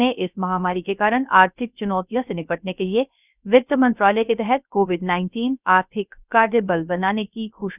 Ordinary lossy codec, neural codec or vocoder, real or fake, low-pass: none; codec, 16 kHz, 0.3 kbps, FocalCodec; fake; 3.6 kHz